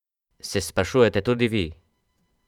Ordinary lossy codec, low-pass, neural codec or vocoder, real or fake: none; 19.8 kHz; vocoder, 44.1 kHz, 128 mel bands every 512 samples, BigVGAN v2; fake